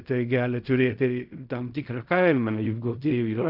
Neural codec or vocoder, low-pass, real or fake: codec, 16 kHz in and 24 kHz out, 0.4 kbps, LongCat-Audio-Codec, fine tuned four codebook decoder; 5.4 kHz; fake